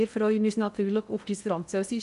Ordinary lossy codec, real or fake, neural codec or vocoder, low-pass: none; fake; codec, 16 kHz in and 24 kHz out, 0.6 kbps, FocalCodec, streaming, 2048 codes; 10.8 kHz